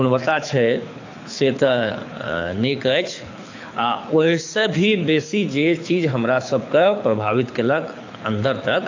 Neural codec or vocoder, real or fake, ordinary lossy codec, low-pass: codec, 24 kHz, 6 kbps, HILCodec; fake; AAC, 48 kbps; 7.2 kHz